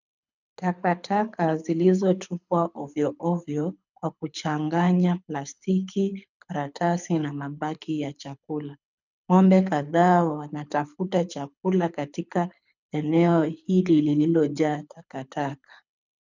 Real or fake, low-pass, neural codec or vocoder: fake; 7.2 kHz; codec, 24 kHz, 6 kbps, HILCodec